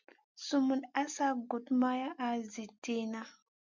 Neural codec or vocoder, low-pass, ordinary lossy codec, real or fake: none; 7.2 kHz; MP3, 64 kbps; real